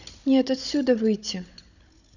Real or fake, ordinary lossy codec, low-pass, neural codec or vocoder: fake; none; 7.2 kHz; codec, 16 kHz, 16 kbps, FunCodec, trained on Chinese and English, 50 frames a second